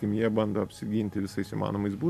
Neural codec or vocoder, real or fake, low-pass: none; real; 14.4 kHz